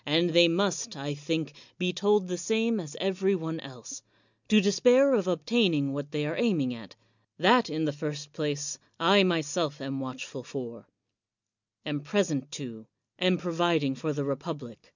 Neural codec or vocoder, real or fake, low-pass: none; real; 7.2 kHz